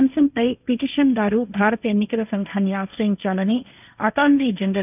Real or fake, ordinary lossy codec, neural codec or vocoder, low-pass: fake; none; codec, 16 kHz, 1.1 kbps, Voila-Tokenizer; 3.6 kHz